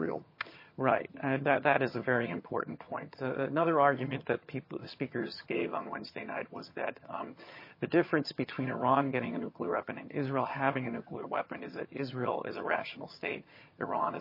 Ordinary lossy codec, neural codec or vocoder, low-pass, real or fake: MP3, 24 kbps; vocoder, 22.05 kHz, 80 mel bands, HiFi-GAN; 5.4 kHz; fake